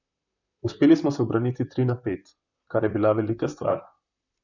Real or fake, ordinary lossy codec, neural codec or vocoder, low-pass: fake; none; vocoder, 44.1 kHz, 128 mel bands, Pupu-Vocoder; 7.2 kHz